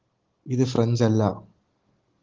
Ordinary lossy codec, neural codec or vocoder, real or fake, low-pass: Opus, 16 kbps; none; real; 7.2 kHz